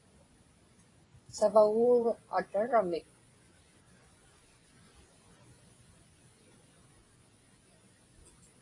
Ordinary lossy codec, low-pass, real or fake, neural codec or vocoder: AAC, 32 kbps; 10.8 kHz; fake; vocoder, 24 kHz, 100 mel bands, Vocos